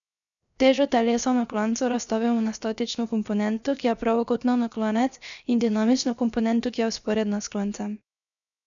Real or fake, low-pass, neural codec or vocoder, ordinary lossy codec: fake; 7.2 kHz; codec, 16 kHz, 0.7 kbps, FocalCodec; MP3, 96 kbps